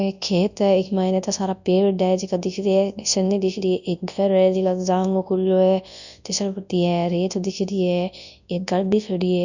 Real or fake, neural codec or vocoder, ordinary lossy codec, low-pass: fake; codec, 24 kHz, 0.9 kbps, WavTokenizer, large speech release; none; 7.2 kHz